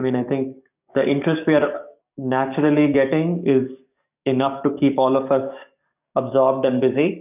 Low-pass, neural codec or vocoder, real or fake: 3.6 kHz; codec, 44.1 kHz, 7.8 kbps, DAC; fake